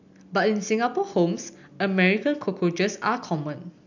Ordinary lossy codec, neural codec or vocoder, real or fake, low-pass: none; none; real; 7.2 kHz